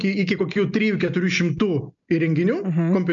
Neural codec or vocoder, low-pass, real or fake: none; 7.2 kHz; real